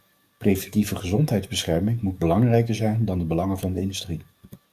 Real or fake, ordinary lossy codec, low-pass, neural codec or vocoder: fake; Opus, 64 kbps; 14.4 kHz; codec, 44.1 kHz, 7.8 kbps, DAC